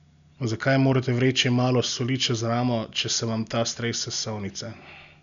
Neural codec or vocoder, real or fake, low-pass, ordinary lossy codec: none; real; 7.2 kHz; none